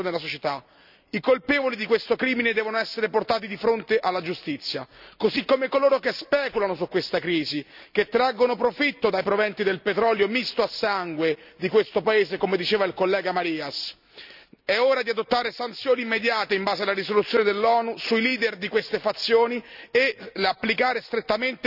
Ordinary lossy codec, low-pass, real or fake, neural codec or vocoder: none; 5.4 kHz; real; none